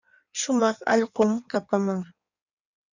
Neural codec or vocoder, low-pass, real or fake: codec, 16 kHz in and 24 kHz out, 1.1 kbps, FireRedTTS-2 codec; 7.2 kHz; fake